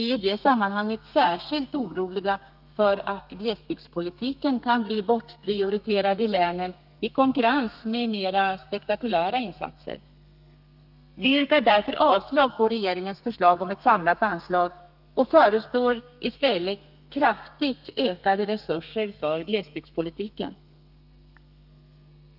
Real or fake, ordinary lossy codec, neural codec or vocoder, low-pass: fake; none; codec, 32 kHz, 1.9 kbps, SNAC; 5.4 kHz